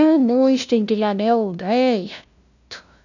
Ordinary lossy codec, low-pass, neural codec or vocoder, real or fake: none; 7.2 kHz; codec, 16 kHz, 0.5 kbps, FunCodec, trained on LibriTTS, 25 frames a second; fake